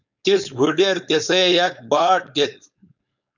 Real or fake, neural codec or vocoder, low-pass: fake; codec, 16 kHz, 4.8 kbps, FACodec; 7.2 kHz